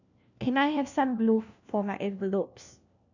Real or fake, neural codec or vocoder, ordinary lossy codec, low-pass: fake; codec, 16 kHz, 1 kbps, FunCodec, trained on LibriTTS, 50 frames a second; none; 7.2 kHz